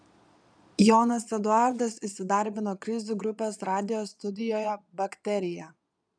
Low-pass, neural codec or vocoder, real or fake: 9.9 kHz; vocoder, 22.05 kHz, 80 mel bands, Vocos; fake